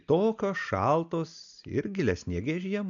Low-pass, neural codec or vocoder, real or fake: 7.2 kHz; none; real